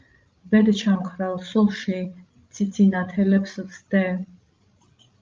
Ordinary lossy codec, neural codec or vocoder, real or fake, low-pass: Opus, 24 kbps; codec, 16 kHz, 16 kbps, FreqCodec, larger model; fake; 7.2 kHz